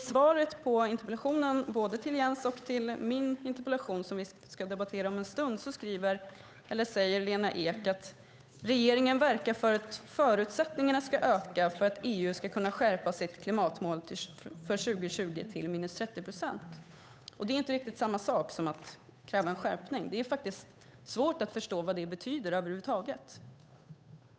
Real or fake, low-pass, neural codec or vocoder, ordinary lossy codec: fake; none; codec, 16 kHz, 8 kbps, FunCodec, trained on Chinese and English, 25 frames a second; none